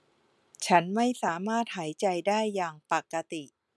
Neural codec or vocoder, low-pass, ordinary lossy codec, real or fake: none; none; none; real